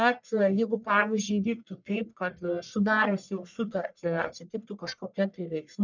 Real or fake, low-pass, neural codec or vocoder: fake; 7.2 kHz; codec, 44.1 kHz, 1.7 kbps, Pupu-Codec